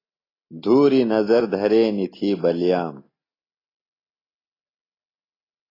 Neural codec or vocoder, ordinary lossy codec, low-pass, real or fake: none; AAC, 24 kbps; 5.4 kHz; real